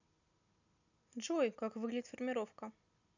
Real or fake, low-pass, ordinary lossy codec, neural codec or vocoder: real; 7.2 kHz; none; none